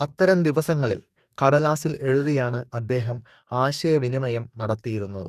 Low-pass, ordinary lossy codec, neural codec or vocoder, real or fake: 14.4 kHz; none; codec, 44.1 kHz, 2.6 kbps, DAC; fake